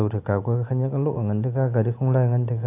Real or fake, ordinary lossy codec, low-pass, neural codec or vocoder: real; AAC, 24 kbps; 3.6 kHz; none